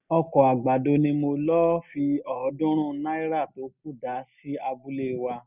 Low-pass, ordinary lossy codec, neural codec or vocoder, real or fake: 3.6 kHz; none; none; real